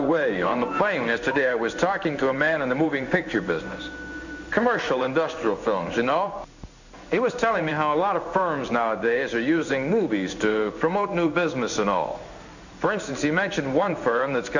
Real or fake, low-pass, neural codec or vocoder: fake; 7.2 kHz; codec, 16 kHz in and 24 kHz out, 1 kbps, XY-Tokenizer